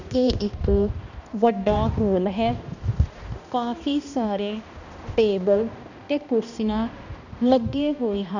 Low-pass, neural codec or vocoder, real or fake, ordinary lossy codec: 7.2 kHz; codec, 16 kHz, 1 kbps, X-Codec, HuBERT features, trained on balanced general audio; fake; none